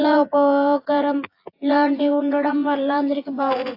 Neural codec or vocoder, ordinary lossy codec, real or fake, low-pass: vocoder, 24 kHz, 100 mel bands, Vocos; AAC, 32 kbps; fake; 5.4 kHz